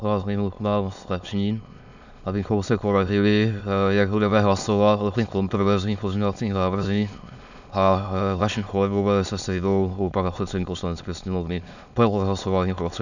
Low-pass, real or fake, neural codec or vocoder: 7.2 kHz; fake; autoencoder, 22.05 kHz, a latent of 192 numbers a frame, VITS, trained on many speakers